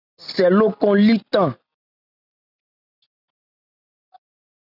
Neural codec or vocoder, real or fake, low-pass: none; real; 5.4 kHz